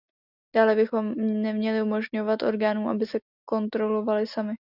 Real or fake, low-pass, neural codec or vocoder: real; 5.4 kHz; none